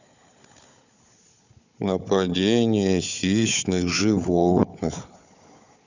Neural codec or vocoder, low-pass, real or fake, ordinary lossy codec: codec, 16 kHz, 4 kbps, FunCodec, trained on Chinese and English, 50 frames a second; 7.2 kHz; fake; none